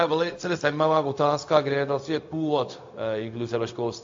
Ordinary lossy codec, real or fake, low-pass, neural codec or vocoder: AAC, 48 kbps; fake; 7.2 kHz; codec, 16 kHz, 0.4 kbps, LongCat-Audio-Codec